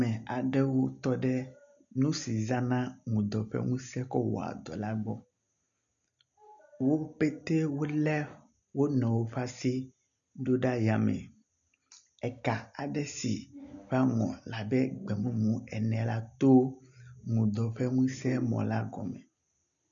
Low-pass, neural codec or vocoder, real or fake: 7.2 kHz; none; real